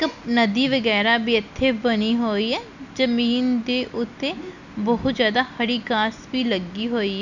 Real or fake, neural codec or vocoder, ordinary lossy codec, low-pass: real; none; none; 7.2 kHz